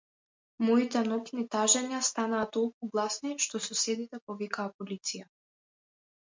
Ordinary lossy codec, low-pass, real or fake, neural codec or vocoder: MP3, 64 kbps; 7.2 kHz; real; none